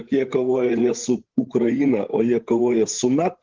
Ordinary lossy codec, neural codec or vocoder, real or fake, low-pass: Opus, 16 kbps; codec, 16 kHz, 8 kbps, FreqCodec, larger model; fake; 7.2 kHz